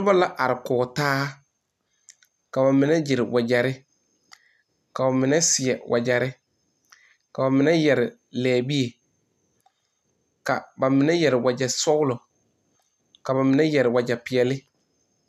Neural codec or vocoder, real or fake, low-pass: none; real; 14.4 kHz